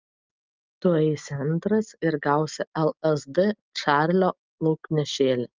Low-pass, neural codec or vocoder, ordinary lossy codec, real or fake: 7.2 kHz; none; Opus, 24 kbps; real